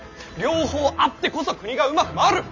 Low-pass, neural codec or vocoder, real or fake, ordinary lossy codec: 7.2 kHz; none; real; MP3, 64 kbps